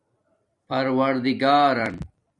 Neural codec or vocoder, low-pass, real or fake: vocoder, 44.1 kHz, 128 mel bands every 256 samples, BigVGAN v2; 10.8 kHz; fake